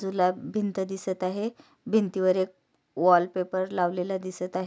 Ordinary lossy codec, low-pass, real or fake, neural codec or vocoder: none; none; real; none